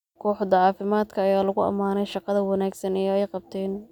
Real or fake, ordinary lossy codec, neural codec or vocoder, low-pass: real; none; none; 19.8 kHz